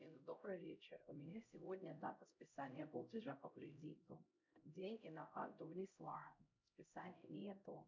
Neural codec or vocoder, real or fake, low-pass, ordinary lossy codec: codec, 16 kHz, 0.5 kbps, X-Codec, HuBERT features, trained on LibriSpeech; fake; 7.2 kHz; MP3, 64 kbps